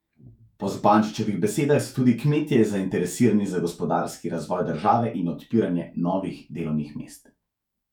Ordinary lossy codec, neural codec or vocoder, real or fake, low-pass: none; autoencoder, 48 kHz, 128 numbers a frame, DAC-VAE, trained on Japanese speech; fake; 19.8 kHz